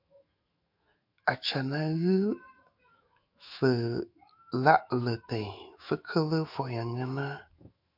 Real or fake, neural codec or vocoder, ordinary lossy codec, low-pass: fake; autoencoder, 48 kHz, 128 numbers a frame, DAC-VAE, trained on Japanese speech; MP3, 48 kbps; 5.4 kHz